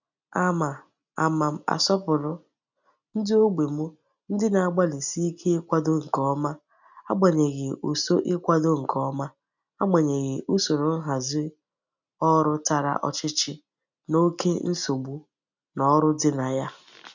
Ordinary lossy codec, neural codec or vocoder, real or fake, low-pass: none; none; real; 7.2 kHz